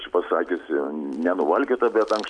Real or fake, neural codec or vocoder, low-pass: real; none; 9.9 kHz